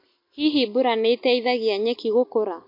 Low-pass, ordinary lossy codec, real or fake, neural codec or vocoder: 5.4 kHz; MP3, 32 kbps; real; none